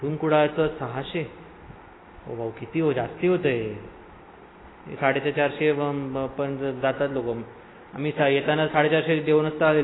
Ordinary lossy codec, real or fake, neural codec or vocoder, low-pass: AAC, 16 kbps; real; none; 7.2 kHz